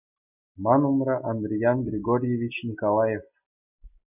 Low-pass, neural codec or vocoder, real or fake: 3.6 kHz; none; real